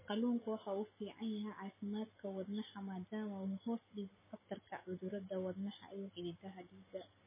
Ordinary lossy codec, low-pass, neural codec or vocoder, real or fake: MP3, 16 kbps; 3.6 kHz; none; real